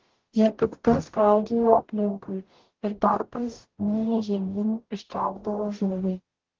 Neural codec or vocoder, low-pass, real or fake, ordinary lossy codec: codec, 44.1 kHz, 0.9 kbps, DAC; 7.2 kHz; fake; Opus, 16 kbps